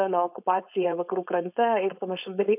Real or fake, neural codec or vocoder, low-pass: fake; codec, 16 kHz, 4.8 kbps, FACodec; 3.6 kHz